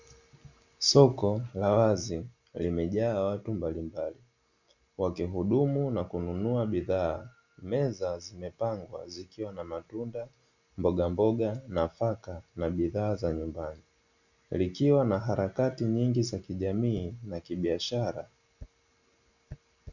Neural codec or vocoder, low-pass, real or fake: none; 7.2 kHz; real